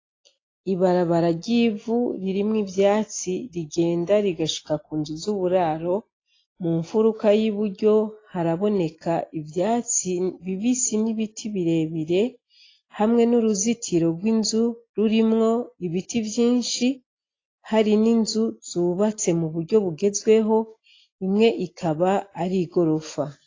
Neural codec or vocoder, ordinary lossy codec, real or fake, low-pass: none; AAC, 32 kbps; real; 7.2 kHz